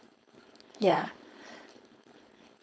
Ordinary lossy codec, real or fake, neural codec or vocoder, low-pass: none; fake; codec, 16 kHz, 4.8 kbps, FACodec; none